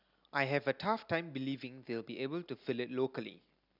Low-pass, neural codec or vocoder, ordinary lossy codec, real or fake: 5.4 kHz; none; none; real